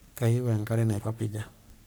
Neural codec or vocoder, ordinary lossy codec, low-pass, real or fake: codec, 44.1 kHz, 3.4 kbps, Pupu-Codec; none; none; fake